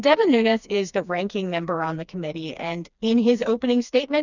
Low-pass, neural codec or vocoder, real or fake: 7.2 kHz; codec, 16 kHz, 2 kbps, FreqCodec, smaller model; fake